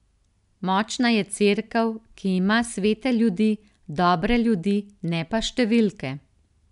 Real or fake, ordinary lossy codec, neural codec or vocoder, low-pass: real; none; none; 10.8 kHz